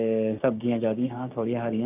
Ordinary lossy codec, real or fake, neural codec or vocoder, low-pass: none; fake; codec, 16 kHz, 6 kbps, DAC; 3.6 kHz